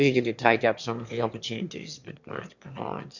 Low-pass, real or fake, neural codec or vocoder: 7.2 kHz; fake; autoencoder, 22.05 kHz, a latent of 192 numbers a frame, VITS, trained on one speaker